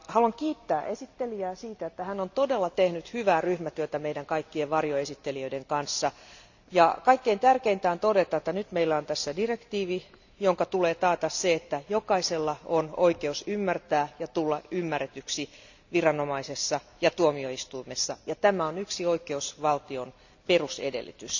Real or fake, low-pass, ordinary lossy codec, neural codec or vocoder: real; 7.2 kHz; none; none